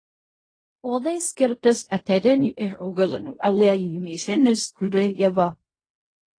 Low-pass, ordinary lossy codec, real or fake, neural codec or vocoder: 9.9 kHz; AAC, 32 kbps; fake; codec, 16 kHz in and 24 kHz out, 0.4 kbps, LongCat-Audio-Codec, fine tuned four codebook decoder